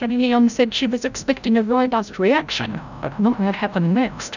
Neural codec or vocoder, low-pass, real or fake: codec, 16 kHz, 0.5 kbps, FreqCodec, larger model; 7.2 kHz; fake